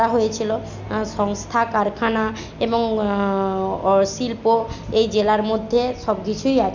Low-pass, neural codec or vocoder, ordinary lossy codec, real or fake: 7.2 kHz; none; none; real